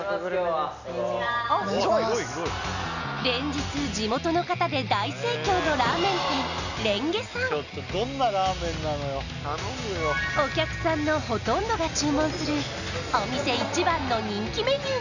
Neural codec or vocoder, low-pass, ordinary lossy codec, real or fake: none; 7.2 kHz; none; real